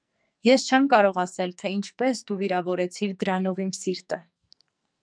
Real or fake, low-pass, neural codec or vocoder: fake; 9.9 kHz; codec, 44.1 kHz, 2.6 kbps, SNAC